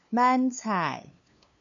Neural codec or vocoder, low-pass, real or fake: codec, 16 kHz, 2 kbps, FunCodec, trained on LibriTTS, 25 frames a second; 7.2 kHz; fake